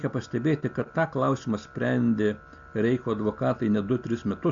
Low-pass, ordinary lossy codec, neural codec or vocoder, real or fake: 7.2 kHz; AAC, 64 kbps; none; real